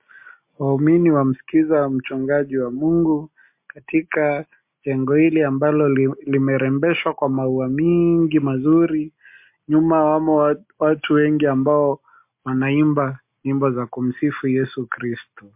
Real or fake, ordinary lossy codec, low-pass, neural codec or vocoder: real; MP3, 32 kbps; 3.6 kHz; none